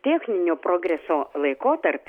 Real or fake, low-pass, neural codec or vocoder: real; 19.8 kHz; none